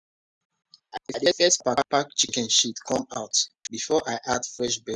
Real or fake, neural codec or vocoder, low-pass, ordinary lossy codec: real; none; 10.8 kHz; none